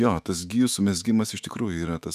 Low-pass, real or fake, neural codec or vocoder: 14.4 kHz; fake; autoencoder, 48 kHz, 128 numbers a frame, DAC-VAE, trained on Japanese speech